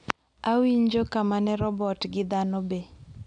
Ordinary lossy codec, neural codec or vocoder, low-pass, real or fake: none; none; 9.9 kHz; real